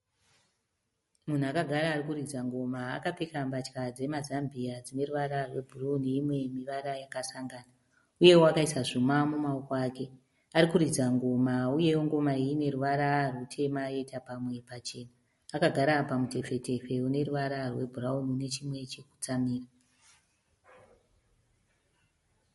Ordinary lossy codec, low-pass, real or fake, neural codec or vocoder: MP3, 48 kbps; 14.4 kHz; real; none